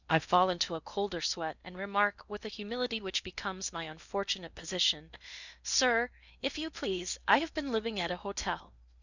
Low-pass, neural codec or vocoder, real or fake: 7.2 kHz; codec, 16 kHz in and 24 kHz out, 0.8 kbps, FocalCodec, streaming, 65536 codes; fake